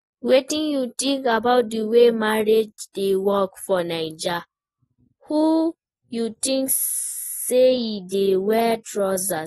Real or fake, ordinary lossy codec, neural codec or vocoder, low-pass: real; AAC, 32 kbps; none; 19.8 kHz